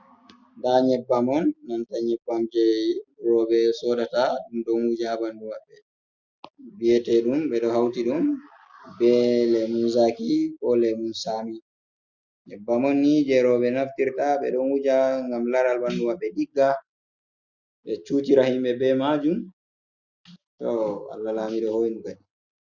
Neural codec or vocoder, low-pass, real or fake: none; 7.2 kHz; real